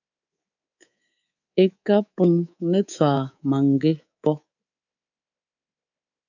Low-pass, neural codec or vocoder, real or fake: 7.2 kHz; codec, 24 kHz, 3.1 kbps, DualCodec; fake